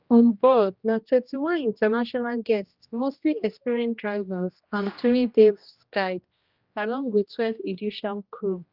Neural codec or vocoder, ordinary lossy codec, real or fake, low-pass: codec, 16 kHz, 1 kbps, X-Codec, HuBERT features, trained on general audio; Opus, 24 kbps; fake; 5.4 kHz